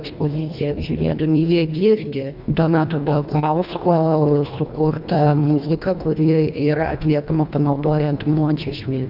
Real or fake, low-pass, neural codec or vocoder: fake; 5.4 kHz; codec, 24 kHz, 1.5 kbps, HILCodec